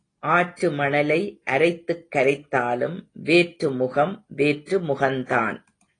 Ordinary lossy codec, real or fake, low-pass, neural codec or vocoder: AAC, 32 kbps; real; 9.9 kHz; none